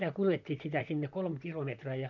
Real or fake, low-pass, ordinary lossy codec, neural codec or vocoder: fake; 7.2 kHz; none; vocoder, 44.1 kHz, 128 mel bands, Pupu-Vocoder